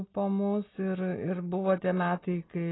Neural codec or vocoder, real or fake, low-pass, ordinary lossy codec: none; real; 7.2 kHz; AAC, 16 kbps